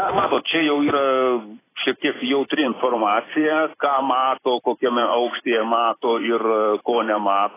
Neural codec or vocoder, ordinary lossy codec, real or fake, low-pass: vocoder, 44.1 kHz, 128 mel bands every 256 samples, BigVGAN v2; AAC, 16 kbps; fake; 3.6 kHz